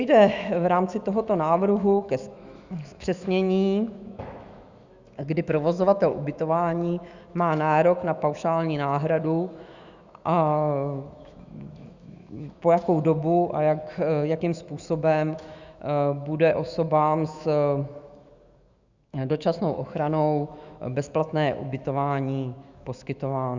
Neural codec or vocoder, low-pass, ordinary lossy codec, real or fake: autoencoder, 48 kHz, 128 numbers a frame, DAC-VAE, trained on Japanese speech; 7.2 kHz; Opus, 64 kbps; fake